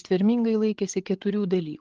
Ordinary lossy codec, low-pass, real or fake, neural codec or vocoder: Opus, 16 kbps; 7.2 kHz; fake; codec, 16 kHz, 8 kbps, FreqCodec, larger model